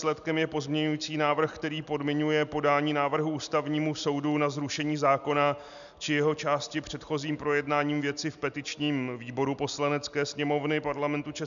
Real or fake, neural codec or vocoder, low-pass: real; none; 7.2 kHz